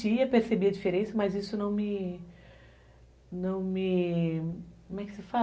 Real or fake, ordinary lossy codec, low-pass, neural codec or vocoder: real; none; none; none